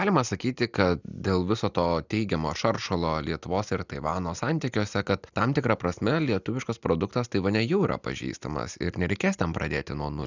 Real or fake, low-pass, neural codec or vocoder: real; 7.2 kHz; none